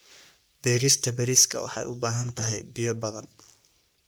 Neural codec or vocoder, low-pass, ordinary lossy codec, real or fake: codec, 44.1 kHz, 3.4 kbps, Pupu-Codec; none; none; fake